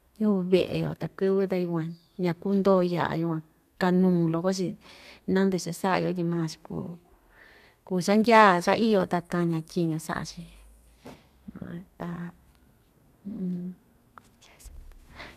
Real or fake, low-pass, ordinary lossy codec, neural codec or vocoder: fake; 14.4 kHz; none; codec, 32 kHz, 1.9 kbps, SNAC